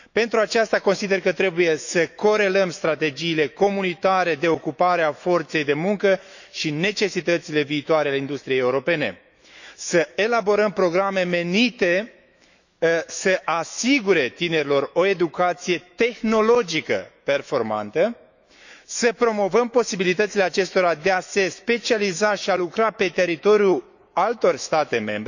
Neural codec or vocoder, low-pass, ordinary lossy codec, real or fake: autoencoder, 48 kHz, 128 numbers a frame, DAC-VAE, trained on Japanese speech; 7.2 kHz; none; fake